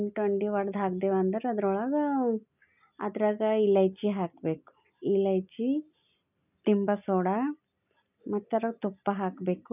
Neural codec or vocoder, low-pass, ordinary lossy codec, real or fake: none; 3.6 kHz; none; real